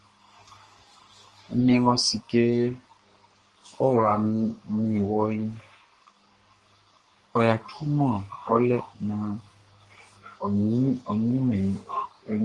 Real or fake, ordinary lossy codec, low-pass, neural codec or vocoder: fake; Opus, 24 kbps; 10.8 kHz; codec, 44.1 kHz, 3.4 kbps, Pupu-Codec